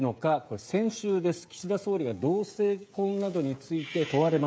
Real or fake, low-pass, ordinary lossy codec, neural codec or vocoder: fake; none; none; codec, 16 kHz, 16 kbps, FreqCodec, smaller model